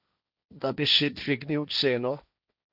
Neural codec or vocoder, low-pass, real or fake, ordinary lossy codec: codec, 16 kHz, 1.1 kbps, Voila-Tokenizer; 5.4 kHz; fake; none